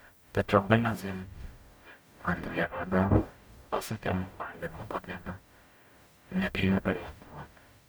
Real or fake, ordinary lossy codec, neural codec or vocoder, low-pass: fake; none; codec, 44.1 kHz, 0.9 kbps, DAC; none